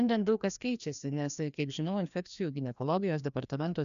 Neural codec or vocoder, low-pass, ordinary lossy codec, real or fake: codec, 16 kHz, 1 kbps, FreqCodec, larger model; 7.2 kHz; MP3, 96 kbps; fake